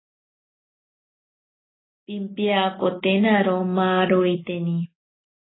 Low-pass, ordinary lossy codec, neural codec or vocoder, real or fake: 7.2 kHz; AAC, 16 kbps; none; real